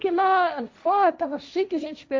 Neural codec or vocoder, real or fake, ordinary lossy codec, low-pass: codec, 16 kHz, 1.1 kbps, Voila-Tokenizer; fake; none; none